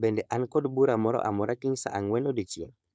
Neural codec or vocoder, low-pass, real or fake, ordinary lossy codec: codec, 16 kHz, 4.8 kbps, FACodec; none; fake; none